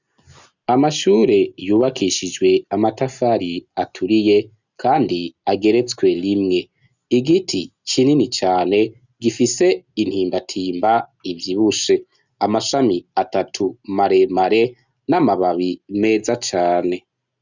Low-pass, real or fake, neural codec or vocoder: 7.2 kHz; real; none